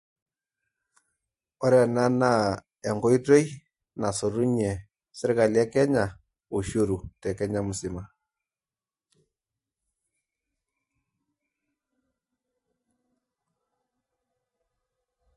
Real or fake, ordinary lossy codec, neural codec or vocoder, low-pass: fake; MP3, 48 kbps; vocoder, 44.1 kHz, 128 mel bands every 512 samples, BigVGAN v2; 14.4 kHz